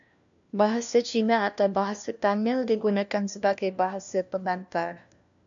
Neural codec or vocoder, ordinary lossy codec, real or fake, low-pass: codec, 16 kHz, 1 kbps, FunCodec, trained on LibriTTS, 50 frames a second; AAC, 64 kbps; fake; 7.2 kHz